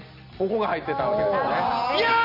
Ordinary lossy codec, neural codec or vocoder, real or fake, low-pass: none; none; real; 5.4 kHz